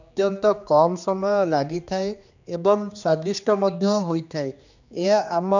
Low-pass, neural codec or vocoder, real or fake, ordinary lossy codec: 7.2 kHz; codec, 16 kHz, 2 kbps, X-Codec, HuBERT features, trained on general audio; fake; none